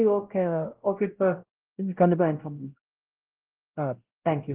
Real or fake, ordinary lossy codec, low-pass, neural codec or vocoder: fake; Opus, 16 kbps; 3.6 kHz; codec, 16 kHz, 0.5 kbps, X-Codec, WavLM features, trained on Multilingual LibriSpeech